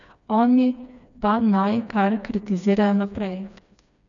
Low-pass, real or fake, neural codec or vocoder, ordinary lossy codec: 7.2 kHz; fake; codec, 16 kHz, 2 kbps, FreqCodec, smaller model; none